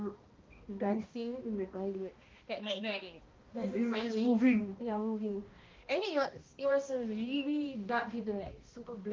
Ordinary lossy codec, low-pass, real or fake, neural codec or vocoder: Opus, 24 kbps; 7.2 kHz; fake; codec, 16 kHz, 1 kbps, X-Codec, HuBERT features, trained on balanced general audio